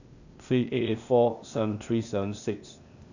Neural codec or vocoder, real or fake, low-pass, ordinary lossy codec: codec, 16 kHz, 0.8 kbps, ZipCodec; fake; 7.2 kHz; none